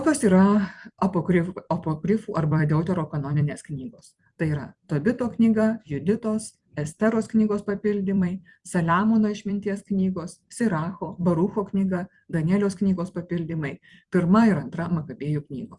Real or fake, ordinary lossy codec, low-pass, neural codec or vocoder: fake; Opus, 64 kbps; 10.8 kHz; vocoder, 44.1 kHz, 128 mel bands every 256 samples, BigVGAN v2